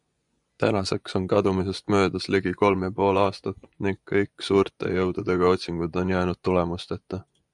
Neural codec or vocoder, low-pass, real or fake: vocoder, 24 kHz, 100 mel bands, Vocos; 10.8 kHz; fake